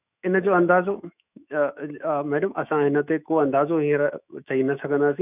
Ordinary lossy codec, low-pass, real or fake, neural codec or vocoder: none; 3.6 kHz; fake; autoencoder, 48 kHz, 128 numbers a frame, DAC-VAE, trained on Japanese speech